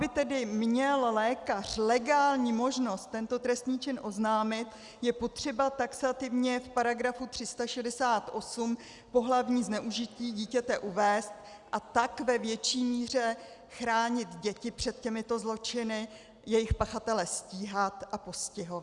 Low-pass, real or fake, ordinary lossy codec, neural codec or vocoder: 10.8 kHz; real; Opus, 64 kbps; none